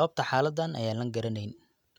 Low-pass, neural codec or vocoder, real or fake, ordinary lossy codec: 19.8 kHz; none; real; none